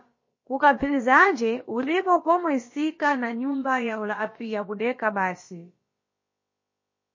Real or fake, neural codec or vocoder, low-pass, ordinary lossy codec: fake; codec, 16 kHz, about 1 kbps, DyCAST, with the encoder's durations; 7.2 kHz; MP3, 32 kbps